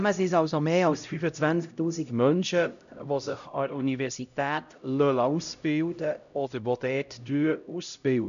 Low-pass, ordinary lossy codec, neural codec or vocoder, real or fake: 7.2 kHz; none; codec, 16 kHz, 0.5 kbps, X-Codec, HuBERT features, trained on LibriSpeech; fake